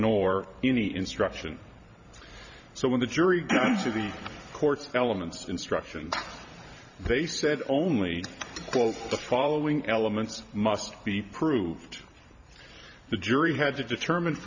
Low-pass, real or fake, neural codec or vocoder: 7.2 kHz; fake; vocoder, 44.1 kHz, 128 mel bands every 512 samples, BigVGAN v2